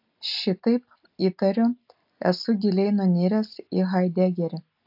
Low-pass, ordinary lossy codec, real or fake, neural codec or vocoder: 5.4 kHz; AAC, 48 kbps; real; none